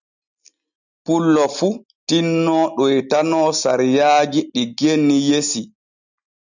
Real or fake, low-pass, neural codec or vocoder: real; 7.2 kHz; none